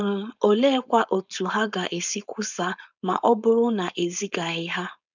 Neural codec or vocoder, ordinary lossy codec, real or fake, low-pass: codec, 16 kHz, 4.8 kbps, FACodec; none; fake; 7.2 kHz